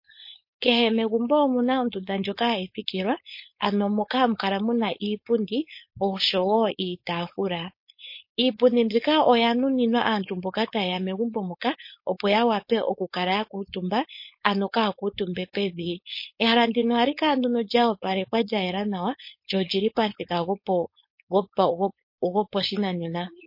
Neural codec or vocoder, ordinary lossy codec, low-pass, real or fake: codec, 16 kHz, 4.8 kbps, FACodec; MP3, 32 kbps; 5.4 kHz; fake